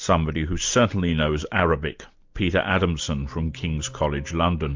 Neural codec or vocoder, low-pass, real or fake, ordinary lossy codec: none; 7.2 kHz; real; MP3, 64 kbps